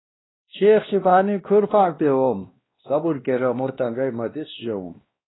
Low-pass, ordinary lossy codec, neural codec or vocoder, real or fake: 7.2 kHz; AAC, 16 kbps; codec, 16 kHz, 1 kbps, X-Codec, WavLM features, trained on Multilingual LibriSpeech; fake